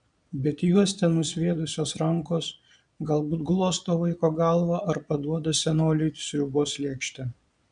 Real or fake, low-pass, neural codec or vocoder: fake; 9.9 kHz; vocoder, 22.05 kHz, 80 mel bands, Vocos